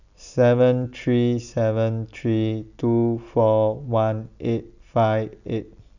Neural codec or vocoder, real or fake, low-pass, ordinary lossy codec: none; real; 7.2 kHz; none